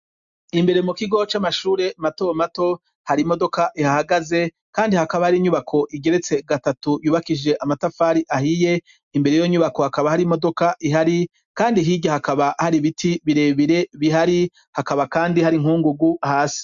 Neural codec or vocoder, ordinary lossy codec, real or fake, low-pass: none; MP3, 64 kbps; real; 7.2 kHz